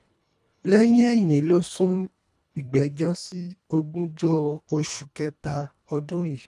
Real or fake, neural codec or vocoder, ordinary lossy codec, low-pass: fake; codec, 24 kHz, 1.5 kbps, HILCodec; none; none